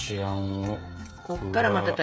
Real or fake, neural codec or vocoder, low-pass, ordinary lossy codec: fake; codec, 16 kHz, 16 kbps, FreqCodec, smaller model; none; none